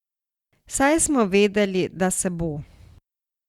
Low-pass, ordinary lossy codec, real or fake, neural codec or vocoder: 19.8 kHz; Opus, 64 kbps; real; none